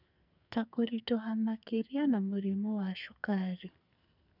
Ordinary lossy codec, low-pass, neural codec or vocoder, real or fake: none; 5.4 kHz; codec, 44.1 kHz, 2.6 kbps, SNAC; fake